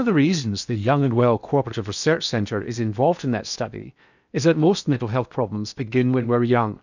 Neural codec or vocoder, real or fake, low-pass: codec, 16 kHz in and 24 kHz out, 0.8 kbps, FocalCodec, streaming, 65536 codes; fake; 7.2 kHz